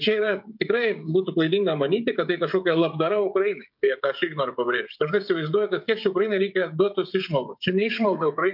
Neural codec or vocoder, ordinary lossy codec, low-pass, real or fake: codec, 16 kHz, 16 kbps, FreqCodec, smaller model; MP3, 48 kbps; 5.4 kHz; fake